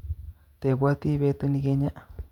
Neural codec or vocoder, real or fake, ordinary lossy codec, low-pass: vocoder, 48 kHz, 128 mel bands, Vocos; fake; none; 19.8 kHz